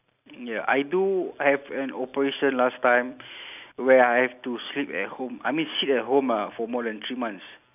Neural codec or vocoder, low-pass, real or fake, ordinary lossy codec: none; 3.6 kHz; real; none